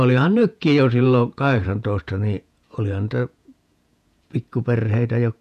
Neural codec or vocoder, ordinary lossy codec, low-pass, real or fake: vocoder, 48 kHz, 128 mel bands, Vocos; none; 14.4 kHz; fake